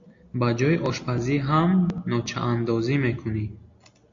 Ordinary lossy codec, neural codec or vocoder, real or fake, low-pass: AAC, 48 kbps; none; real; 7.2 kHz